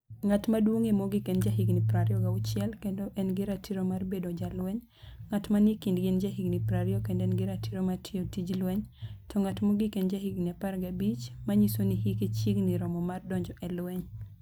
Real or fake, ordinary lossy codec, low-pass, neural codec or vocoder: real; none; none; none